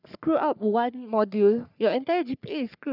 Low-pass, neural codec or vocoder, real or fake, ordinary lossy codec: 5.4 kHz; codec, 44.1 kHz, 3.4 kbps, Pupu-Codec; fake; none